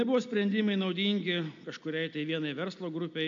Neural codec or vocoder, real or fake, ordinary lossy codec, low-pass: none; real; MP3, 48 kbps; 7.2 kHz